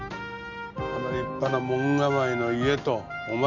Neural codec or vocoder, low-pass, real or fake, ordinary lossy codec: none; 7.2 kHz; real; none